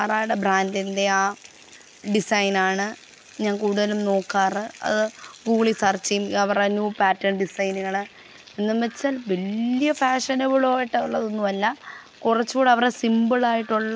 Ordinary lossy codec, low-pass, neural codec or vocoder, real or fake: none; none; none; real